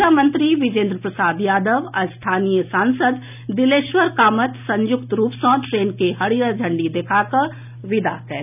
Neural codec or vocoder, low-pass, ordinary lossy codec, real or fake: none; 3.6 kHz; none; real